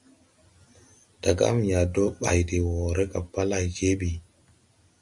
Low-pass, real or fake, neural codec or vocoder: 10.8 kHz; real; none